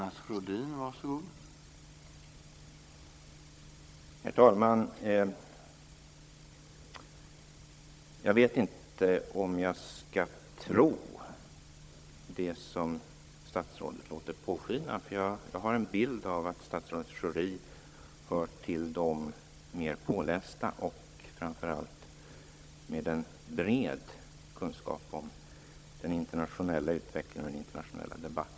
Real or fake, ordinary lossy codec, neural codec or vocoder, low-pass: fake; none; codec, 16 kHz, 16 kbps, FunCodec, trained on Chinese and English, 50 frames a second; none